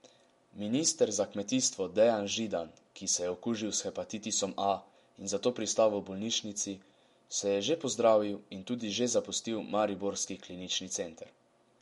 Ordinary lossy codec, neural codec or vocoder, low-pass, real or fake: MP3, 48 kbps; none; 14.4 kHz; real